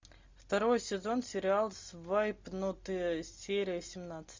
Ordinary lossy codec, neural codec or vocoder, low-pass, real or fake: MP3, 64 kbps; none; 7.2 kHz; real